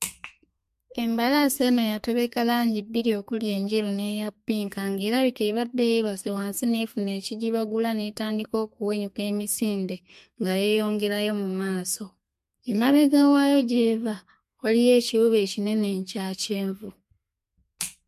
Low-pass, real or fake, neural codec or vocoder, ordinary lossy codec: 14.4 kHz; fake; codec, 32 kHz, 1.9 kbps, SNAC; MP3, 64 kbps